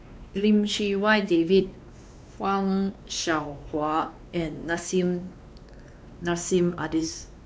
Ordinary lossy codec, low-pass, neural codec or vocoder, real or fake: none; none; codec, 16 kHz, 2 kbps, X-Codec, WavLM features, trained on Multilingual LibriSpeech; fake